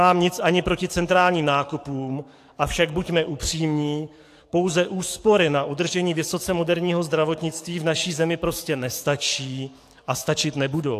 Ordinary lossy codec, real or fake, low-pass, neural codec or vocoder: AAC, 64 kbps; fake; 14.4 kHz; codec, 44.1 kHz, 7.8 kbps, DAC